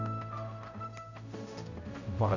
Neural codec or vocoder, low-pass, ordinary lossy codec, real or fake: codec, 16 kHz, 0.5 kbps, X-Codec, HuBERT features, trained on general audio; 7.2 kHz; none; fake